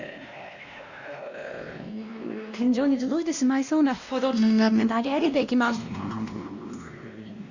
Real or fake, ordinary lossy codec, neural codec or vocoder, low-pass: fake; Opus, 64 kbps; codec, 16 kHz, 1 kbps, X-Codec, WavLM features, trained on Multilingual LibriSpeech; 7.2 kHz